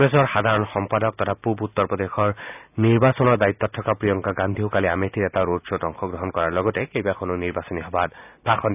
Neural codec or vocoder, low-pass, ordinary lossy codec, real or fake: none; 3.6 kHz; none; real